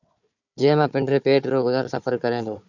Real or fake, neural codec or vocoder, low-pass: fake; codec, 16 kHz, 4 kbps, FunCodec, trained on Chinese and English, 50 frames a second; 7.2 kHz